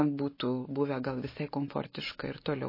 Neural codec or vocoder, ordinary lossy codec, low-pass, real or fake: none; MP3, 24 kbps; 5.4 kHz; real